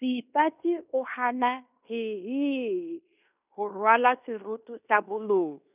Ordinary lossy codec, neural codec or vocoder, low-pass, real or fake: none; codec, 16 kHz in and 24 kHz out, 0.9 kbps, LongCat-Audio-Codec, fine tuned four codebook decoder; 3.6 kHz; fake